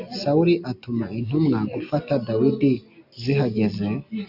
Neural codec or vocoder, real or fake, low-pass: none; real; 5.4 kHz